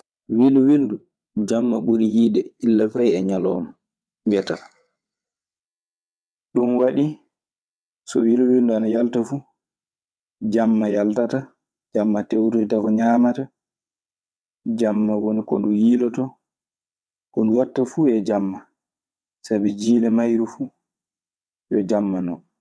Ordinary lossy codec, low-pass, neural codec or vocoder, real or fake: none; none; vocoder, 22.05 kHz, 80 mel bands, WaveNeXt; fake